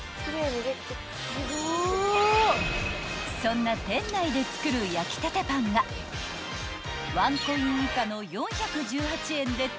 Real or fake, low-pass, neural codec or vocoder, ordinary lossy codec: real; none; none; none